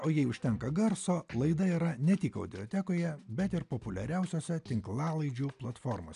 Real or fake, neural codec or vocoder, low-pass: real; none; 10.8 kHz